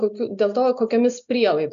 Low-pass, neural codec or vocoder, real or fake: 7.2 kHz; none; real